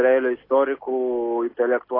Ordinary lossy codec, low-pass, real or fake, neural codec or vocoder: AAC, 24 kbps; 5.4 kHz; real; none